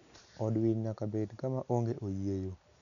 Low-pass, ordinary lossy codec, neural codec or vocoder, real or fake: 7.2 kHz; MP3, 96 kbps; none; real